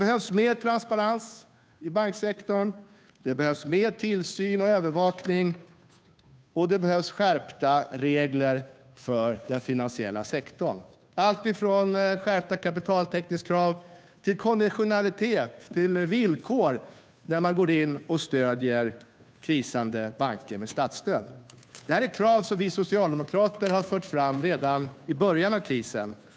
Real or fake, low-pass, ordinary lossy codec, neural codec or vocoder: fake; none; none; codec, 16 kHz, 2 kbps, FunCodec, trained on Chinese and English, 25 frames a second